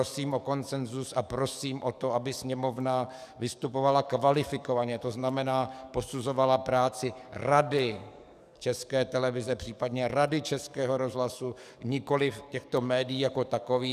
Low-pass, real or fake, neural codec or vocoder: 14.4 kHz; fake; codec, 44.1 kHz, 7.8 kbps, DAC